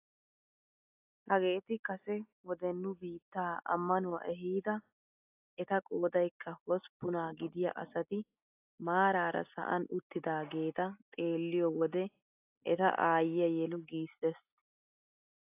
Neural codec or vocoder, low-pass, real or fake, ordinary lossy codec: none; 3.6 kHz; real; AAC, 32 kbps